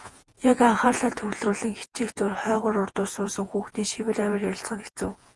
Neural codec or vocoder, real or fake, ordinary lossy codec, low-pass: vocoder, 48 kHz, 128 mel bands, Vocos; fake; Opus, 24 kbps; 10.8 kHz